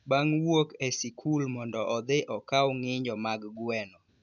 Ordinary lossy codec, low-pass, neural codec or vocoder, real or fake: none; 7.2 kHz; none; real